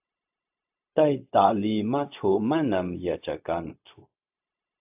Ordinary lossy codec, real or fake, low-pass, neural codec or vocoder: AAC, 32 kbps; fake; 3.6 kHz; codec, 16 kHz, 0.4 kbps, LongCat-Audio-Codec